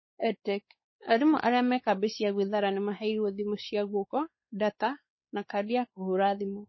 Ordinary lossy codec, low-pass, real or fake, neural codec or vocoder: MP3, 24 kbps; 7.2 kHz; fake; codec, 16 kHz, 4 kbps, X-Codec, WavLM features, trained on Multilingual LibriSpeech